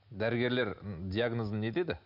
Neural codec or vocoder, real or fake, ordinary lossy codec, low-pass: none; real; none; 5.4 kHz